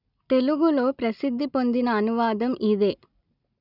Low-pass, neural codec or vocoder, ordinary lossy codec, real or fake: 5.4 kHz; none; none; real